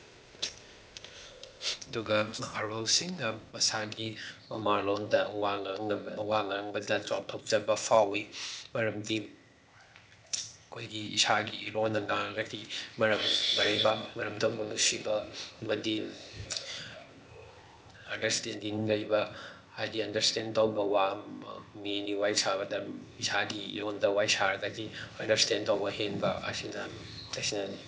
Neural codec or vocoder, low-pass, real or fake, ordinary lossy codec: codec, 16 kHz, 0.8 kbps, ZipCodec; none; fake; none